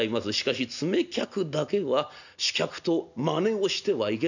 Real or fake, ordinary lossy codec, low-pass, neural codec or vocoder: real; none; 7.2 kHz; none